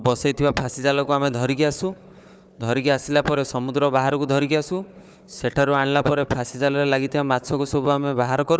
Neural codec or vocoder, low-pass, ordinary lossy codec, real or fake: codec, 16 kHz, 4 kbps, FunCodec, trained on Chinese and English, 50 frames a second; none; none; fake